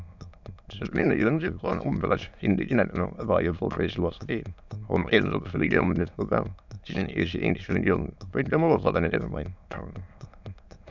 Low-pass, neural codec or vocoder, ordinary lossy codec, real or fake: 7.2 kHz; autoencoder, 22.05 kHz, a latent of 192 numbers a frame, VITS, trained on many speakers; none; fake